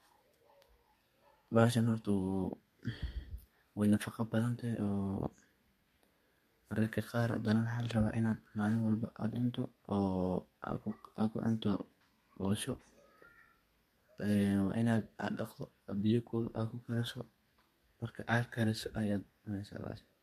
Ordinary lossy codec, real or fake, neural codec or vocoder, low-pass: MP3, 64 kbps; fake; codec, 32 kHz, 1.9 kbps, SNAC; 14.4 kHz